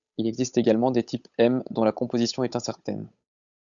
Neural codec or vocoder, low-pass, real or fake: codec, 16 kHz, 8 kbps, FunCodec, trained on Chinese and English, 25 frames a second; 7.2 kHz; fake